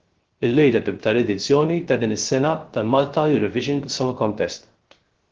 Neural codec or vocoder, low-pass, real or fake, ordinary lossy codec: codec, 16 kHz, 0.3 kbps, FocalCodec; 7.2 kHz; fake; Opus, 16 kbps